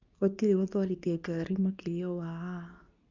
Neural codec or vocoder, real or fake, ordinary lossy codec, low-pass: codec, 24 kHz, 0.9 kbps, WavTokenizer, medium speech release version 2; fake; none; 7.2 kHz